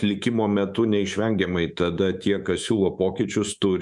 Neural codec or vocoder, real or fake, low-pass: codec, 24 kHz, 3.1 kbps, DualCodec; fake; 10.8 kHz